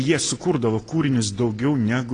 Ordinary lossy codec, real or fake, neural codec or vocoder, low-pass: AAC, 32 kbps; real; none; 9.9 kHz